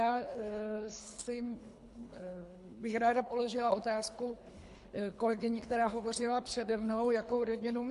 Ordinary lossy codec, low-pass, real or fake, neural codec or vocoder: MP3, 64 kbps; 10.8 kHz; fake; codec, 24 kHz, 3 kbps, HILCodec